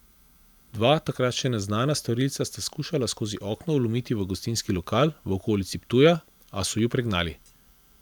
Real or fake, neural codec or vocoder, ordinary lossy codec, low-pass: fake; vocoder, 44.1 kHz, 128 mel bands every 512 samples, BigVGAN v2; none; none